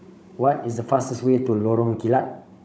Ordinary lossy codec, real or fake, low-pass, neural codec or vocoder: none; fake; none; codec, 16 kHz, 16 kbps, FunCodec, trained on Chinese and English, 50 frames a second